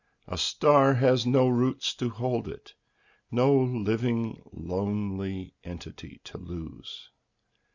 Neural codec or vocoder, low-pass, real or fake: none; 7.2 kHz; real